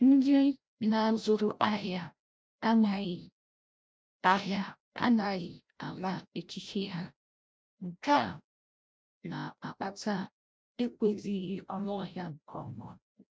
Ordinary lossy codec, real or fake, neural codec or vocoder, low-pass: none; fake; codec, 16 kHz, 0.5 kbps, FreqCodec, larger model; none